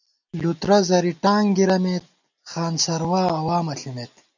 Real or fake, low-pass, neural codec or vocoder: real; 7.2 kHz; none